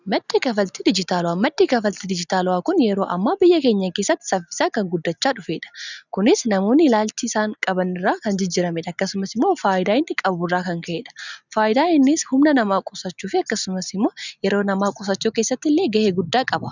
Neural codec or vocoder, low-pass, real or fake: none; 7.2 kHz; real